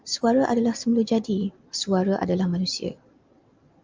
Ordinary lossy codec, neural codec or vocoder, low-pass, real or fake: Opus, 24 kbps; none; 7.2 kHz; real